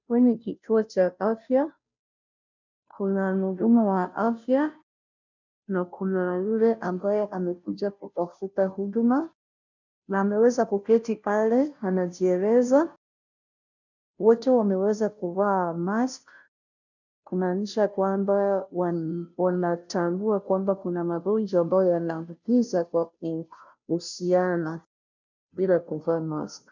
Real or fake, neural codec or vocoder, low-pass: fake; codec, 16 kHz, 0.5 kbps, FunCodec, trained on Chinese and English, 25 frames a second; 7.2 kHz